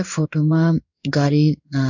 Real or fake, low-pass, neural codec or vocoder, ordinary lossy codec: fake; 7.2 kHz; codec, 16 kHz in and 24 kHz out, 1 kbps, XY-Tokenizer; none